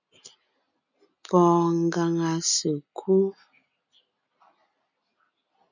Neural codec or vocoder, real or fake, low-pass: none; real; 7.2 kHz